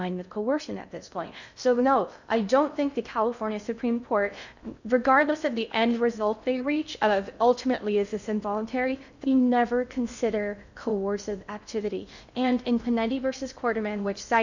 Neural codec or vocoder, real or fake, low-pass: codec, 16 kHz in and 24 kHz out, 0.6 kbps, FocalCodec, streaming, 2048 codes; fake; 7.2 kHz